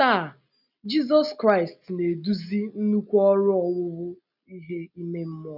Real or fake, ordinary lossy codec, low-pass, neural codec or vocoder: real; none; 5.4 kHz; none